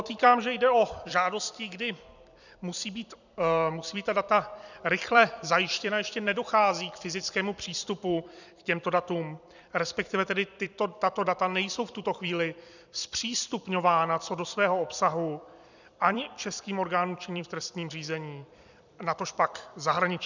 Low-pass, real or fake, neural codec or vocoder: 7.2 kHz; fake; vocoder, 44.1 kHz, 128 mel bands every 256 samples, BigVGAN v2